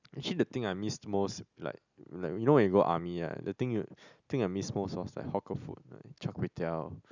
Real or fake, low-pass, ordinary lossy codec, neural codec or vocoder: real; 7.2 kHz; none; none